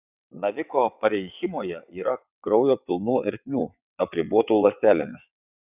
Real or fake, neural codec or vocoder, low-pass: fake; vocoder, 22.05 kHz, 80 mel bands, WaveNeXt; 3.6 kHz